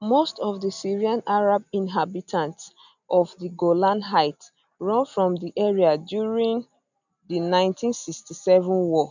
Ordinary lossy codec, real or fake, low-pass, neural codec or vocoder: none; real; 7.2 kHz; none